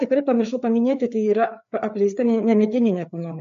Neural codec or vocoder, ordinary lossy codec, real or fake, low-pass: codec, 16 kHz, 4 kbps, FreqCodec, larger model; MP3, 48 kbps; fake; 7.2 kHz